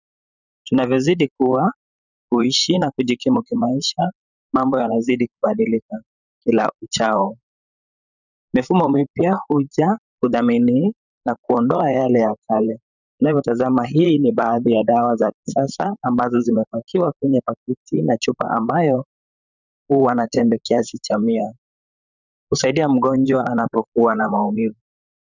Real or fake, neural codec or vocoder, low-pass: fake; vocoder, 44.1 kHz, 128 mel bands, Pupu-Vocoder; 7.2 kHz